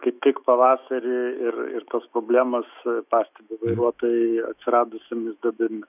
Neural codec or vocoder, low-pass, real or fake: autoencoder, 48 kHz, 128 numbers a frame, DAC-VAE, trained on Japanese speech; 3.6 kHz; fake